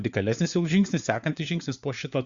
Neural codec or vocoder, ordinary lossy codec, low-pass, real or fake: codec, 16 kHz, 4 kbps, FunCodec, trained on LibriTTS, 50 frames a second; Opus, 64 kbps; 7.2 kHz; fake